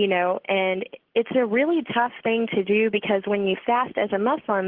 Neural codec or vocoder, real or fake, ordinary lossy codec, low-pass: none; real; Opus, 16 kbps; 5.4 kHz